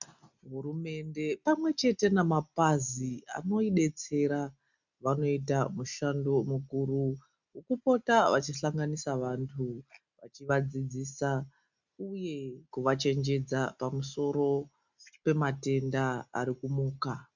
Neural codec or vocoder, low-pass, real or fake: none; 7.2 kHz; real